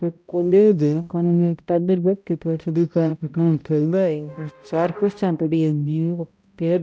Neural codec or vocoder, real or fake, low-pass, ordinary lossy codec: codec, 16 kHz, 0.5 kbps, X-Codec, HuBERT features, trained on balanced general audio; fake; none; none